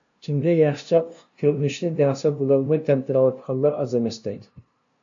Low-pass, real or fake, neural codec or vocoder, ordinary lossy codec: 7.2 kHz; fake; codec, 16 kHz, 0.5 kbps, FunCodec, trained on LibriTTS, 25 frames a second; AAC, 64 kbps